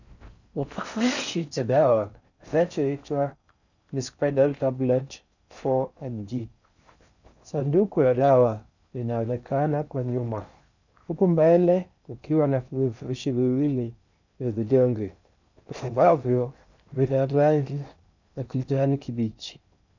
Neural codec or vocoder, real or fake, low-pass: codec, 16 kHz in and 24 kHz out, 0.6 kbps, FocalCodec, streaming, 4096 codes; fake; 7.2 kHz